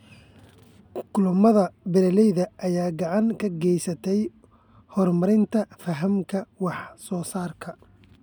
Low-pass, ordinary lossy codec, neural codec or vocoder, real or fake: 19.8 kHz; none; none; real